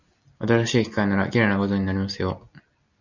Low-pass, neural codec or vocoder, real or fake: 7.2 kHz; none; real